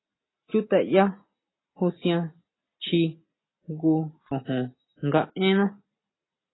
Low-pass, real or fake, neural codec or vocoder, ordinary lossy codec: 7.2 kHz; real; none; AAC, 16 kbps